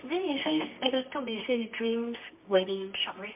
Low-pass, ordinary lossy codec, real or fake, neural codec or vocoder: 3.6 kHz; MP3, 32 kbps; fake; codec, 24 kHz, 0.9 kbps, WavTokenizer, medium music audio release